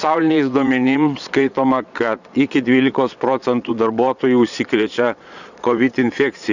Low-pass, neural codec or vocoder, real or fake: 7.2 kHz; vocoder, 22.05 kHz, 80 mel bands, Vocos; fake